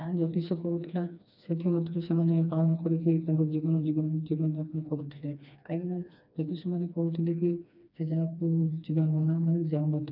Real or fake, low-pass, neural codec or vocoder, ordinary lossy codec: fake; 5.4 kHz; codec, 16 kHz, 2 kbps, FreqCodec, smaller model; none